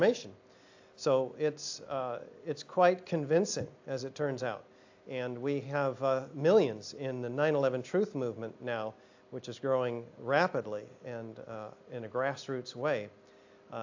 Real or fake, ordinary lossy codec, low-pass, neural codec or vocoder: real; AAC, 48 kbps; 7.2 kHz; none